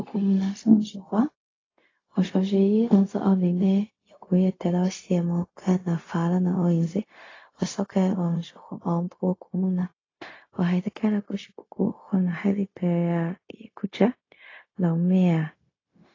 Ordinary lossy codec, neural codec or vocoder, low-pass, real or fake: AAC, 32 kbps; codec, 16 kHz, 0.4 kbps, LongCat-Audio-Codec; 7.2 kHz; fake